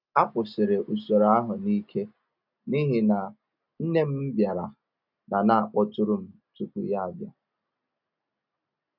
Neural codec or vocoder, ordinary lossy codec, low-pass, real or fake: none; none; 5.4 kHz; real